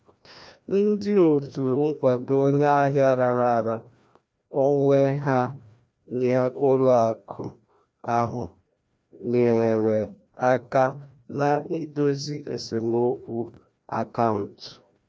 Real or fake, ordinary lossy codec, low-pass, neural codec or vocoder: fake; none; none; codec, 16 kHz, 1 kbps, FreqCodec, larger model